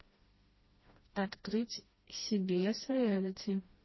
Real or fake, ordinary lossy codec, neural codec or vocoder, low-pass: fake; MP3, 24 kbps; codec, 16 kHz, 1 kbps, FreqCodec, smaller model; 7.2 kHz